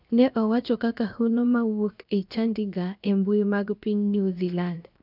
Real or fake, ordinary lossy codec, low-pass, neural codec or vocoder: fake; none; 5.4 kHz; codec, 16 kHz, 0.7 kbps, FocalCodec